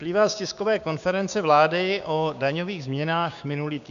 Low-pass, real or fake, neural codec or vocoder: 7.2 kHz; fake; codec, 16 kHz, 6 kbps, DAC